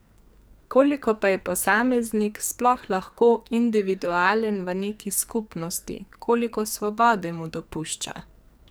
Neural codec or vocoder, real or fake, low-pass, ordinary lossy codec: codec, 44.1 kHz, 2.6 kbps, SNAC; fake; none; none